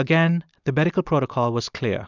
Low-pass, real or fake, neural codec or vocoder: 7.2 kHz; real; none